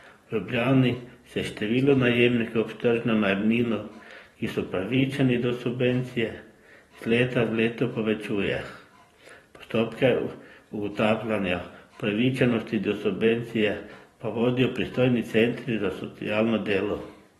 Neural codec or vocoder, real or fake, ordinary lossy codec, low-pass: vocoder, 44.1 kHz, 128 mel bands every 512 samples, BigVGAN v2; fake; AAC, 32 kbps; 19.8 kHz